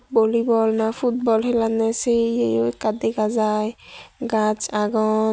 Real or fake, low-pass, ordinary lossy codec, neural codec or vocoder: real; none; none; none